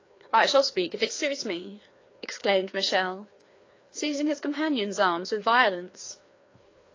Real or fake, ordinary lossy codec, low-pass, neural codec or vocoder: fake; AAC, 32 kbps; 7.2 kHz; codec, 16 kHz, 2 kbps, FreqCodec, larger model